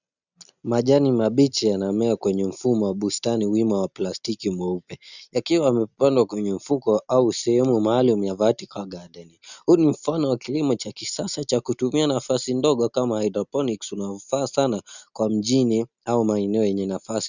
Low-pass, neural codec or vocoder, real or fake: 7.2 kHz; none; real